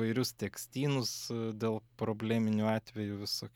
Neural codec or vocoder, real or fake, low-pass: none; real; 19.8 kHz